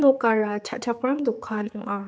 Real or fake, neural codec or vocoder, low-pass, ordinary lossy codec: fake; codec, 16 kHz, 4 kbps, X-Codec, HuBERT features, trained on general audio; none; none